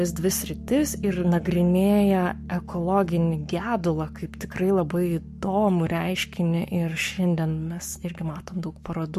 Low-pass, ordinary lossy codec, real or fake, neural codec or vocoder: 14.4 kHz; MP3, 64 kbps; fake; codec, 44.1 kHz, 7.8 kbps, Pupu-Codec